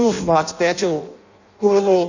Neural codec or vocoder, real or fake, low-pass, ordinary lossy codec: codec, 16 kHz in and 24 kHz out, 0.6 kbps, FireRedTTS-2 codec; fake; 7.2 kHz; none